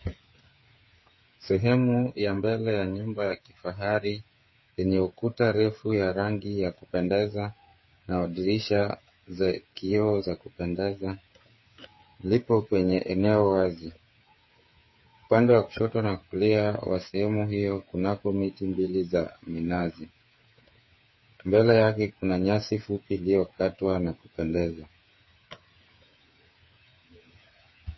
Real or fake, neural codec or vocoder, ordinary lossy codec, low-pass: fake; codec, 16 kHz, 8 kbps, FreqCodec, smaller model; MP3, 24 kbps; 7.2 kHz